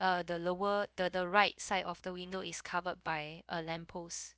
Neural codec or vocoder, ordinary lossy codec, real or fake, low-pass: codec, 16 kHz, about 1 kbps, DyCAST, with the encoder's durations; none; fake; none